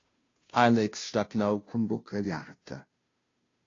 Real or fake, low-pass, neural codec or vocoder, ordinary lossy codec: fake; 7.2 kHz; codec, 16 kHz, 0.5 kbps, FunCodec, trained on Chinese and English, 25 frames a second; AAC, 32 kbps